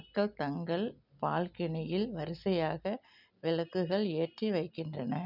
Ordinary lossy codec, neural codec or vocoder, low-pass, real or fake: none; none; 5.4 kHz; real